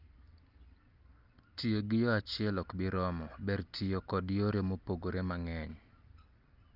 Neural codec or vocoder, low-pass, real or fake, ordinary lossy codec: none; 5.4 kHz; real; Opus, 24 kbps